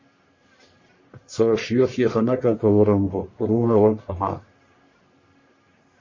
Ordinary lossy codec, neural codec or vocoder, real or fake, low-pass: MP3, 32 kbps; codec, 44.1 kHz, 1.7 kbps, Pupu-Codec; fake; 7.2 kHz